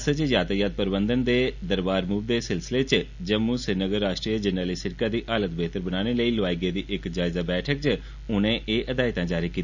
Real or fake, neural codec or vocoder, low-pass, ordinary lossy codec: real; none; 7.2 kHz; none